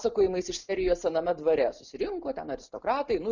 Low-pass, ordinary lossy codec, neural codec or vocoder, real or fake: 7.2 kHz; Opus, 64 kbps; none; real